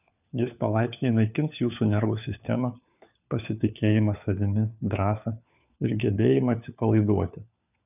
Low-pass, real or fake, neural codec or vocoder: 3.6 kHz; fake; codec, 16 kHz, 4 kbps, FunCodec, trained on LibriTTS, 50 frames a second